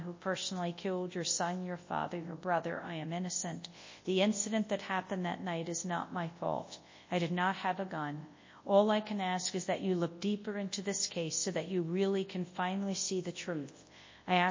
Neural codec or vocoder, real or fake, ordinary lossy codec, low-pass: codec, 24 kHz, 0.9 kbps, WavTokenizer, large speech release; fake; MP3, 32 kbps; 7.2 kHz